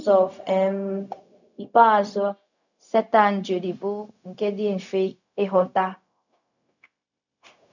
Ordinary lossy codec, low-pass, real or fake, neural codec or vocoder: none; 7.2 kHz; fake; codec, 16 kHz, 0.4 kbps, LongCat-Audio-Codec